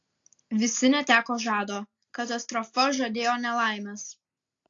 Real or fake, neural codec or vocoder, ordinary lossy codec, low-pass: real; none; AAC, 48 kbps; 7.2 kHz